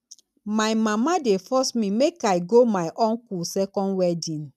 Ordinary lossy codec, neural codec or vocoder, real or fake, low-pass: none; none; real; 14.4 kHz